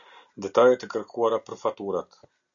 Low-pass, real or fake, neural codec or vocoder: 7.2 kHz; real; none